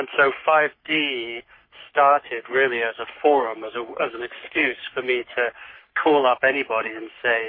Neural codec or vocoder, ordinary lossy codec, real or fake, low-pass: codec, 44.1 kHz, 3.4 kbps, Pupu-Codec; MP3, 24 kbps; fake; 5.4 kHz